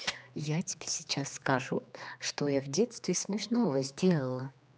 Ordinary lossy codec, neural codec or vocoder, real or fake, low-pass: none; codec, 16 kHz, 2 kbps, X-Codec, HuBERT features, trained on general audio; fake; none